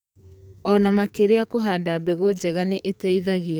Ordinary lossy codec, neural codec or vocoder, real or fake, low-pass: none; codec, 44.1 kHz, 2.6 kbps, SNAC; fake; none